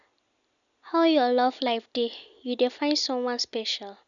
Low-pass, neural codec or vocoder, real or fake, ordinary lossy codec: 7.2 kHz; none; real; none